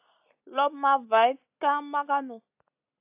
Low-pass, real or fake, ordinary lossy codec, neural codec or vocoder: 3.6 kHz; real; AAC, 32 kbps; none